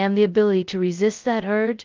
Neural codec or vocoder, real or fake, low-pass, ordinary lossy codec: codec, 16 kHz, 0.2 kbps, FocalCodec; fake; 7.2 kHz; Opus, 32 kbps